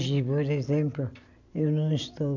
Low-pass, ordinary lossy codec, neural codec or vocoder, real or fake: 7.2 kHz; none; none; real